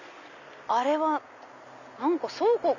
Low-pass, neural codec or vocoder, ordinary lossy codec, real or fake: 7.2 kHz; none; none; real